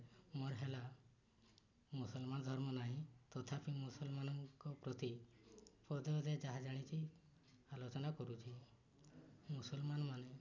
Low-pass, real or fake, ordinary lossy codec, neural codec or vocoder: 7.2 kHz; real; none; none